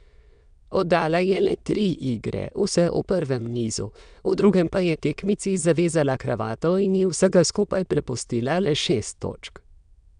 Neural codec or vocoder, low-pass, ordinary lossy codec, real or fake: autoencoder, 22.05 kHz, a latent of 192 numbers a frame, VITS, trained on many speakers; 9.9 kHz; none; fake